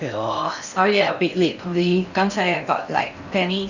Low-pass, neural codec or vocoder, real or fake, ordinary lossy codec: 7.2 kHz; codec, 16 kHz in and 24 kHz out, 0.6 kbps, FocalCodec, streaming, 4096 codes; fake; none